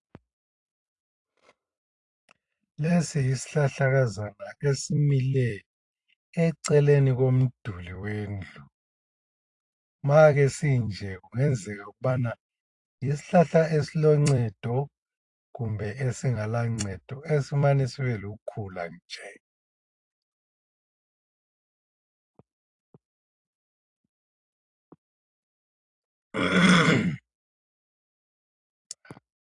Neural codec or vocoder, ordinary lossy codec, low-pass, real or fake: vocoder, 44.1 kHz, 128 mel bands every 256 samples, BigVGAN v2; AAC, 48 kbps; 10.8 kHz; fake